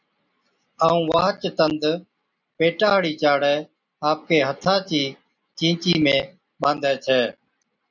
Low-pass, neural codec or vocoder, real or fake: 7.2 kHz; none; real